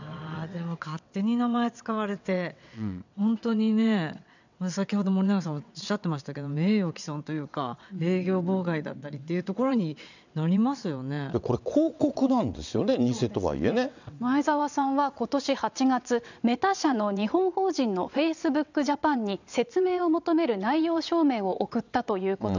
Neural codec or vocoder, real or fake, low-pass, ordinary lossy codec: vocoder, 22.05 kHz, 80 mel bands, WaveNeXt; fake; 7.2 kHz; none